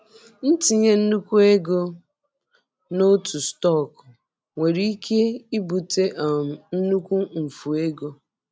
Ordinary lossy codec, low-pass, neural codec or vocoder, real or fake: none; none; none; real